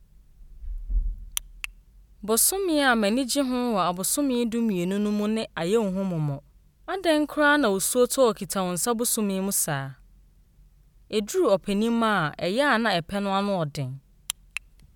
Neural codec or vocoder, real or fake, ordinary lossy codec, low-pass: none; real; none; 19.8 kHz